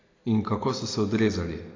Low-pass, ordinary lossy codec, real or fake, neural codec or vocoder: 7.2 kHz; AAC, 32 kbps; real; none